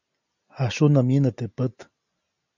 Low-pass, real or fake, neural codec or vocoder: 7.2 kHz; real; none